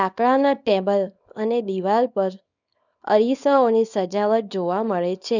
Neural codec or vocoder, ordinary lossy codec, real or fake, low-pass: codec, 24 kHz, 0.9 kbps, WavTokenizer, small release; none; fake; 7.2 kHz